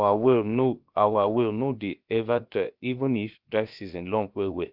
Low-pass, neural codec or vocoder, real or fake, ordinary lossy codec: 5.4 kHz; codec, 16 kHz, about 1 kbps, DyCAST, with the encoder's durations; fake; Opus, 24 kbps